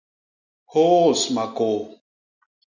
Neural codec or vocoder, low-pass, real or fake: none; 7.2 kHz; real